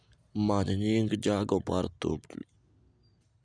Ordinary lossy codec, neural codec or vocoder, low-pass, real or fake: none; none; 9.9 kHz; real